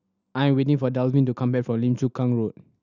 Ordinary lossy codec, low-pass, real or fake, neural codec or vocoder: none; 7.2 kHz; real; none